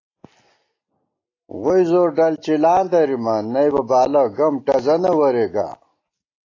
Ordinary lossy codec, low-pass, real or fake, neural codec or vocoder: AAC, 32 kbps; 7.2 kHz; real; none